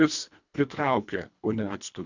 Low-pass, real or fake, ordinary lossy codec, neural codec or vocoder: 7.2 kHz; fake; Opus, 64 kbps; codec, 24 kHz, 1.5 kbps, HILCodec